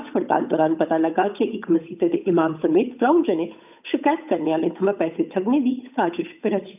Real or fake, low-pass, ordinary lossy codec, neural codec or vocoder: fake; 3.6 kHz; none; codec, 16 kHz, 8 kbps, FunCodec, trained on Chinese and English, 25 frames a second